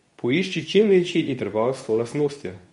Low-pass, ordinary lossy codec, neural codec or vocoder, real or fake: 10.8 kHz; MP3, 48 kbps; codec, 24 kHz, 0.9 kbps, WavTokenizer, medium speech release version 2; fake